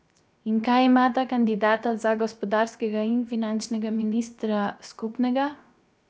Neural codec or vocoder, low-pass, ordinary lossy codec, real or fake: codec, 16 kHz, 0.7 kbps, FocalCodec; none; none; fake